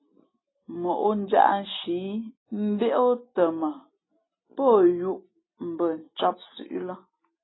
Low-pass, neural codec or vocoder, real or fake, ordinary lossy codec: 7.2 kHz; none; real; AAC, 16 kbps